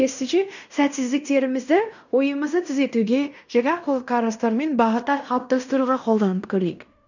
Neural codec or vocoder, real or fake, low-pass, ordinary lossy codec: codec, 16 kHz in and 24 kHz out, 0.9 kbps, LongCat-Audio-Codec, fine tuned four codebook decoder; fake; 7.2 kHz; none